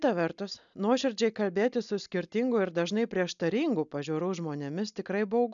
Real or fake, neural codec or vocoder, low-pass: real; none; 7.2 kHz